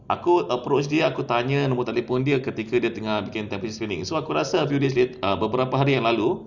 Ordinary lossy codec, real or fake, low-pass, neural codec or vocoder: none; real; 7.2 kHz; none